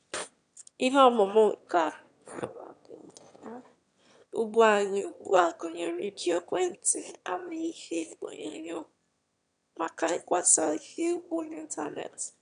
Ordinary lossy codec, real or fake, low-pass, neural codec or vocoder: none; fake; 9.9 kHz; autoencoder, 22.05 kHz, a latent of 192 numbers a frame, VITS, trained on one speaker